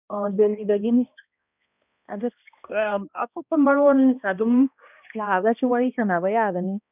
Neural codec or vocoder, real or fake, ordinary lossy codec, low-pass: codec, 16 kHz, 1 kbps, X-Codec, HuBERT features, trained on balanced general audio; fake; none; 3.6 kHz